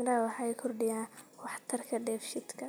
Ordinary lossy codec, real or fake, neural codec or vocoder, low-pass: none; real; none; none